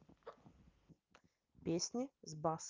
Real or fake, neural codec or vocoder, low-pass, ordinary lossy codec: real; none; 7.2 kHz; Opus, 24 kbps